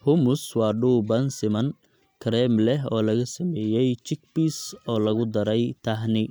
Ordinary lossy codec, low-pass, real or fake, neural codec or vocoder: none; none; real; none